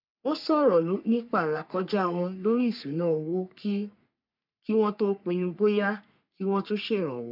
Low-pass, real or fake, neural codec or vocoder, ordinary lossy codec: 5.4 kHz; fake; codec, 44.1 kHz, 3.4 kbps, Pupu-Codec; none